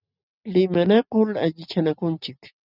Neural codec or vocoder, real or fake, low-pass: none; real; 5.4 kHz